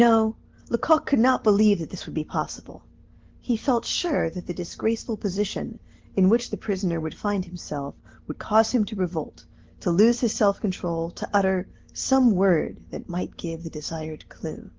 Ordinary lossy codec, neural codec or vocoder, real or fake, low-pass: Opus, 16 kbps; none; real; 7.2 kHz